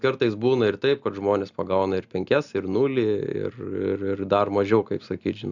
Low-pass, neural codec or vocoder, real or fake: 7.2 kHz; none; real